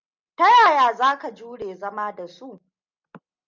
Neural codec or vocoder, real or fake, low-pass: none; real; 7.2 kHz